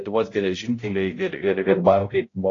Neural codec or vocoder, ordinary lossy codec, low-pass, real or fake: codec, 16 kHz, 0.5 kbps, X-Codec, HuBERT features, trained on balanced general audio; AAC, 32 kbps; 7.2 kHz; fake